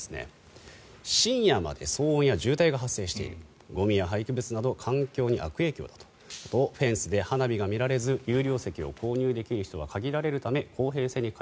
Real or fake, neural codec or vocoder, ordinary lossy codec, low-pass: real; none; none; none